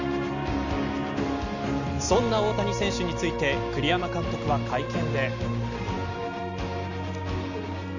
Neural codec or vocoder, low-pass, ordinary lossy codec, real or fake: none; 7.2 kHz; none; real